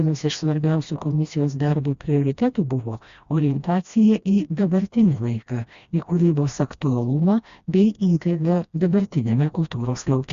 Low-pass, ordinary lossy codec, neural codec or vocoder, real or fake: 7.2 kHz; Opus, 64 kbps; codec, 16 kHz, 1 kbps, FreqCodec, smaller model; fake